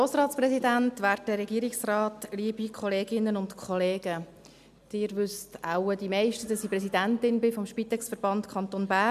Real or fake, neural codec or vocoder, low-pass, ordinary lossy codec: real; none; 14.4 kHz; none